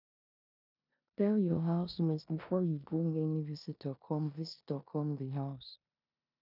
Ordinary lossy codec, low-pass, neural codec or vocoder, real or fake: none; 5.4 kHz; codec, 16 kHz in and 24 kHz out, 0.9 kbps, LongCat-Audio-Codec, four codebook decoder; fake